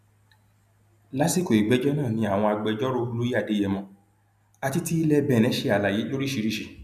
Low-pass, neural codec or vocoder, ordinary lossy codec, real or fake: 14.4 kHz; none; none; real